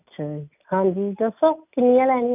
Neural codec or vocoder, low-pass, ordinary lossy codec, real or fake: none; 3.6 kHz; none; real